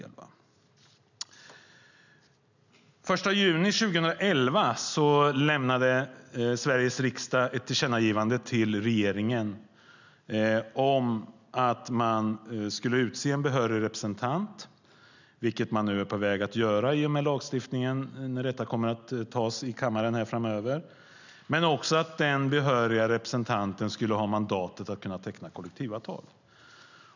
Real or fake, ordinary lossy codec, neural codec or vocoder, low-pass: real; none; none; 7.2 kHz